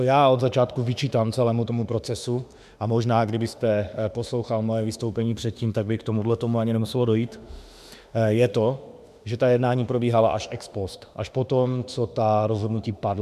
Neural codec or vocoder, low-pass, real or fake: autoencoder, 48 kHz, 32 numbers a frame, DAC-VAE, trained on Japanese speech; 14.4 kHz; fake